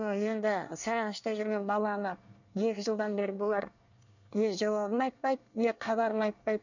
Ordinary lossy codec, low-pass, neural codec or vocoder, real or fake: none; 7.2 kHz; codec, 24 kHz, 1 kbps, SNAC; fake